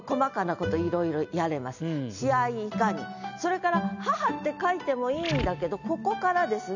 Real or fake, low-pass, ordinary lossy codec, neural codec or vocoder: real; 7.2 kHz; none; none